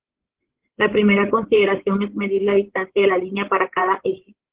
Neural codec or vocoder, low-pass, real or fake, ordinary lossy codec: codec, 16 kHz, 16 kbps, FreqCodec, larger model; 3.6 kHz; fake; Opus, 16 kbps